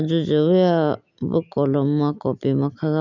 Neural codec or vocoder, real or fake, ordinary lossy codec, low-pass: none; real; none; 7.2 kHz